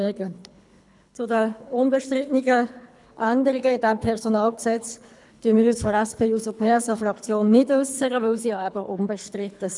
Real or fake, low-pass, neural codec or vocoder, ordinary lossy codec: fake; 10.8 kHz; codec, 24 kHz, 3 kbps, HILCodec; none